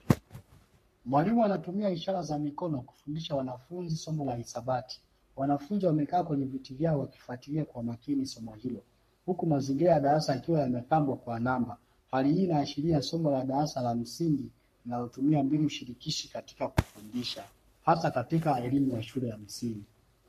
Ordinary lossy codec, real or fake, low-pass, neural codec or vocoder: MP3, 64 kbps; fake; 14.4 kHz; codec, 44.1 kHz, 3.4 kbps, Pupu-Codec